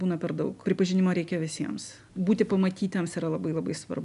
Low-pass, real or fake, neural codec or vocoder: 10.8 kHz; real; none